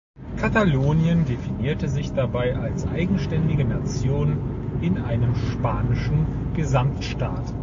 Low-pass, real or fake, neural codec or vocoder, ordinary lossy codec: 7.2 kHz; real; none; AAC, 48 kbps